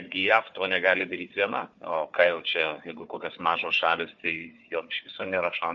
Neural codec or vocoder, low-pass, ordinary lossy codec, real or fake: codec, 16 kHz, 4 kbps, FunCodec, trained on Chinese and English, 50 frames a second; 7.2 kHz; MP3, 48 kbps; fake